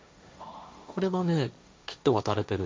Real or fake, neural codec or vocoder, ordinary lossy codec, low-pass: fake; codec, 16 kHz, 1.1 kbps, Voila-Tokenizer; none; none